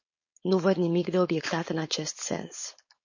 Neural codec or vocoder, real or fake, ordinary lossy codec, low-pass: codec, 16 kHz, 4.8 kbps, FACodec; fake; MP3, 32 kbps; 7.2 kHz